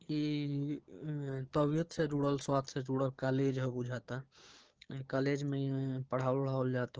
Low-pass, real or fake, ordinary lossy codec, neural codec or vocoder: 7.2 kHz; fake; Opus, 16 kbps; codec, 16 kHz, 6 kbps, DAC